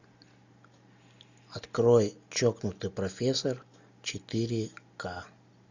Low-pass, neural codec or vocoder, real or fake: 7.2 kHz; none; real